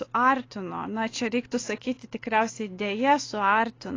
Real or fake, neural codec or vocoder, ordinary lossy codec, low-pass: real; none; AAC, 32 kbps; 7.2 kHz